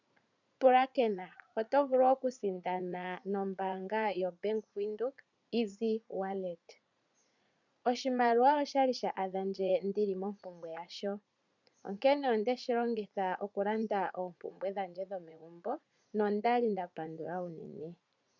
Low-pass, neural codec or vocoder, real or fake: 7.2 kHz; vocoder, 44.1 kHz, 80 mel bands, Vocos; fake